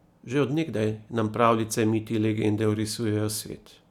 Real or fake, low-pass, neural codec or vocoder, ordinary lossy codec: real; 19.8 kHz; none; none